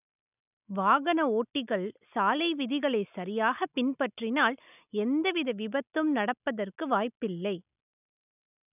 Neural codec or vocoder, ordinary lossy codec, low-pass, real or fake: none; none; 3.6 kHz; real